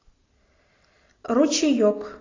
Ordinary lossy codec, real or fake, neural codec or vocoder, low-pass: AAC, 32 kbps; real; none; 7.2 kHz